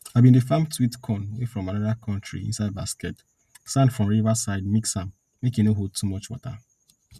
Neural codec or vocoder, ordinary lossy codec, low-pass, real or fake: none; none; 14.4 kHz; real